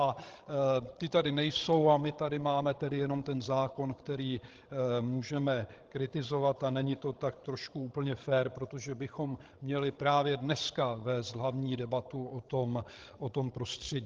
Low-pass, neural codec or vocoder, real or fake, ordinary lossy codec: 7.2 kHz; codec, 16 kHz, 16 kbps, FreqCodec, larger model; fake; Opus, 16 kbps